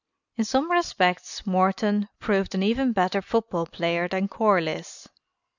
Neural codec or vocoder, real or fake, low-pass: none; real; 7.2 kHz